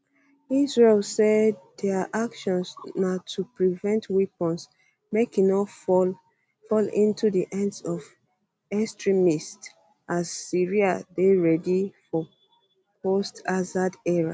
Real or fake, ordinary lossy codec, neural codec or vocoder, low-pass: real; none; none; none